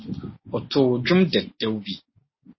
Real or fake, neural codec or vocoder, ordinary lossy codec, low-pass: real; none; MP3, 24 kbps; 7.2 kHz